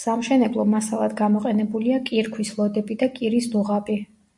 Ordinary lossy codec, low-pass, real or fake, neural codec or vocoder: MP3, 64 kbps; 10.8 kHz; real; none